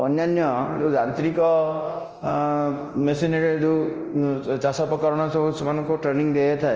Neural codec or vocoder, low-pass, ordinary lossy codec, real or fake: codec, 24 kHz, 0.9 kbps, DualCodec; 7.2 kHz; Opus, 24 kbps; fake